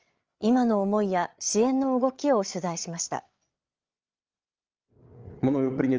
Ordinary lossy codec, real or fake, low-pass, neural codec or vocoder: Opus, 24 kbps; fake; 7.2 kHz; codec, 16 kHz, 8 kbps, FreqCodec, larger model